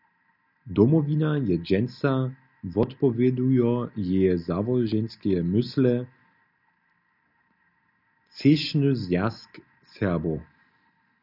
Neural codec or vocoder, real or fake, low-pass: none; real; 5.4 kHz